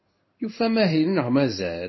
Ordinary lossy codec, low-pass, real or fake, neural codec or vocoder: MP3, 24 kbps; 7.2 kHz; fake; codec, 16 kHz in and 24 kHz out, 1 kbps, XY-Tokenizer